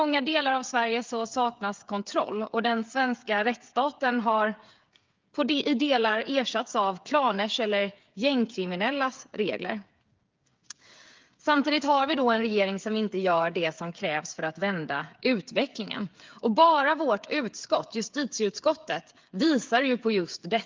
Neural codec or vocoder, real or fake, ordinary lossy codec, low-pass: codec, 16 kHz, 8 kbps, FreqCodec, smaller model; fake; Opus, 24 kbps; 7.2 kHz